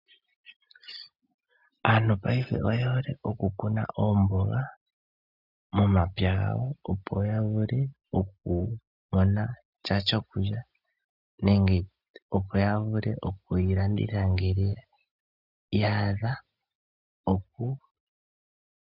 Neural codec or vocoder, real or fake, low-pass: none; real; 5.4 kHz